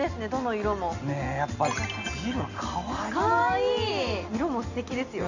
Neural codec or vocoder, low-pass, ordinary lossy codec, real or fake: none; 7.2 kHz; Opus, 64 kbps; real